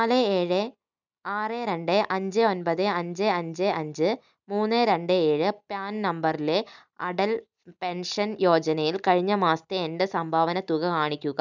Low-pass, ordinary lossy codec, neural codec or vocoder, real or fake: 7.2 kHz; none; none; real